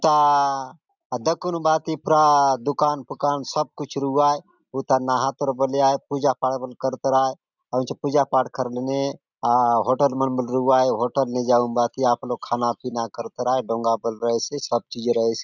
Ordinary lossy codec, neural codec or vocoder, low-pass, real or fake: none; none; 7.2 kHz; real